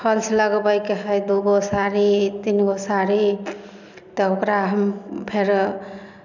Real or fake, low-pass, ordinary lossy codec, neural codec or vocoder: real; 7.2 kHz; none; none